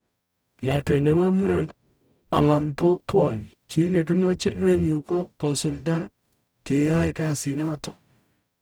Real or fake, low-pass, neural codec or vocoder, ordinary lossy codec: fake; none; codec, 44.1 kHz, 0.9 kbps, DAC; none